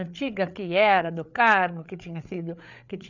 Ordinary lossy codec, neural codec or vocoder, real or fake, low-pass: none; codec, 16 kHz, 8 kbps, FreqCodec, larger model; fake; 7.2 kHz